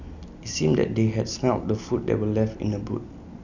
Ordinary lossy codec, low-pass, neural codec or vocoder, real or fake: none; 7.2 kHz; none; real